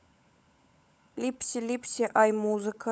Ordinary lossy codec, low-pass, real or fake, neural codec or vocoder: none; none; fake; codec, 16 kHz, 16 kbps, FunCodec, trained on LibriTTS, 50 frames a second